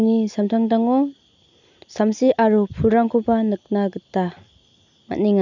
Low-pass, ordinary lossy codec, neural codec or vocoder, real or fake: 7.2 kHz; none; none; real